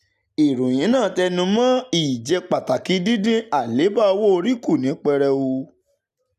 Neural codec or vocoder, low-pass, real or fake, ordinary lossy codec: none; 14.4 kHz; real; none